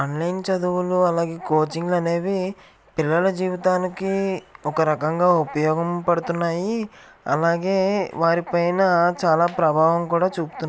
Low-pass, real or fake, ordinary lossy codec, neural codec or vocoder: none; real; none; none